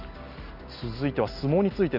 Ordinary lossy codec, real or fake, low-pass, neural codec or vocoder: none; real; 5.4 kHz; none